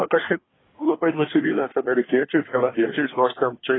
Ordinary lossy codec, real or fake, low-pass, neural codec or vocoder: AAC, 16 kbps; fake; 7.2 kHz; codec, 24 kHz, 1 kbps, SNAC